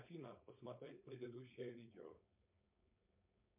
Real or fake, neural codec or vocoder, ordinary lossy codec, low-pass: fake; codec, 16 kHz, 4.8 kbps, FACodec; MP3, 32 kbps; 3.6 kHz